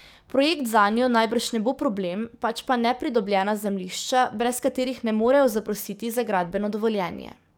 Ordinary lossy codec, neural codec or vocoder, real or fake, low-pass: none; codec, 44.1 kHz, 7.8 kbps, DAC; fake; none